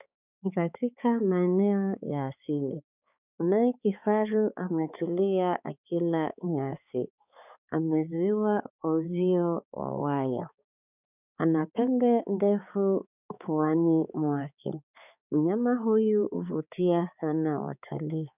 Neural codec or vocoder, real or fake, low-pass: codec, 16 kHz, 4 kbps, X-Codec, HuBERT features, trained on balanced general audio; fake; 3.6 kHz